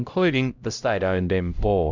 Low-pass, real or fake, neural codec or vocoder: 7.2 kHz; fake; codec, 16 kHz, 0.5 kbps, X-Codec, HuBERT features, trained on LibriSpeech